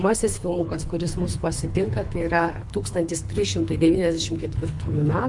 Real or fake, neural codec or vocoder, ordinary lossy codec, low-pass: fake; codec, 24 kHz, 3 kbps, HILCodec; MP3, 64 kbps; 10.8 kHz